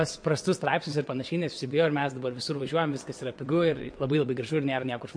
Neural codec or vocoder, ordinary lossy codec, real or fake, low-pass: vocoder, 22.05 kHz, 80 mel bands, WaveNeXt; MP3, 48 kbps; fake; 9.9 kHz